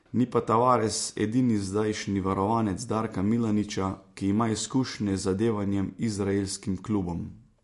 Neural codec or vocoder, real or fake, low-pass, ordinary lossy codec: none; real; 14.4 kHz; MP3, 48 kbps